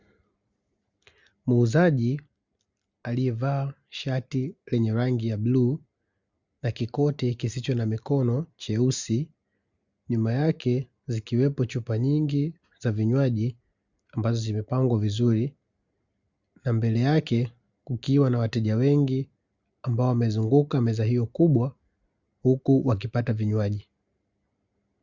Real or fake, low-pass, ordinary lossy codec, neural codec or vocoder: real; 7.2 kHz; Opus, 64 kbps; none